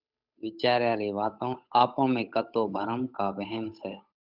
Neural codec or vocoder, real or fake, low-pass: codec, 16 kHz, 8 kbps, FunCodec, trained on Chinese and English, 25 frames a second; fake; 5.4 kHz